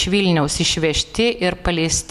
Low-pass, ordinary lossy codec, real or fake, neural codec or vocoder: 14.4 kHz; MP3, 96 kbps; real; none